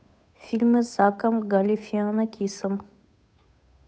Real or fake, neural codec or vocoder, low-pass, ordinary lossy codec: fake; codec, 16 kHz, 8 kbps, FunCodec, trained on Chinese and English, 25 frames a second; none; none